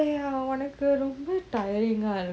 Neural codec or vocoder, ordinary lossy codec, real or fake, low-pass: none; none; real; none